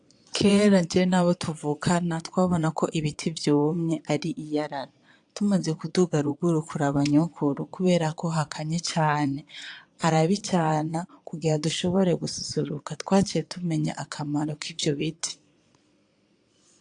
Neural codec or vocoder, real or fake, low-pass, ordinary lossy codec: vocoder, 22.05 kHz, 80 mel bands, Vocos; fake; 9.9 kHz; AAC, 64 kbps